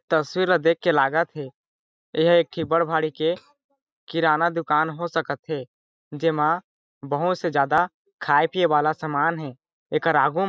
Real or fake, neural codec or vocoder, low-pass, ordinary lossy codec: real; none; none; none